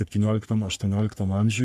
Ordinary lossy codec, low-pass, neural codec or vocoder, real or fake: AAC, 96 kbps; 14.4 kHz; codec, 44.1 kHz, 3.4 kbps, Pupu-Codec; fake